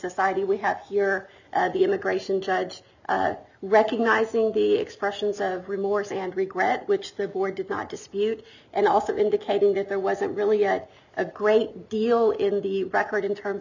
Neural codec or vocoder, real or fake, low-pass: none; real; 7.2 kHz